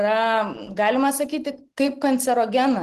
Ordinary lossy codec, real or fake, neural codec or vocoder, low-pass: Opus, 16 kbps; real; none; 14.4 kHz